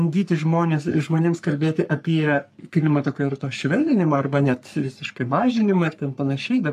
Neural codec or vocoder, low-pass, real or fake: codec, 44.1 kHz, 3.4 kbps, Pupu-Codec; 14.4 kHz; fake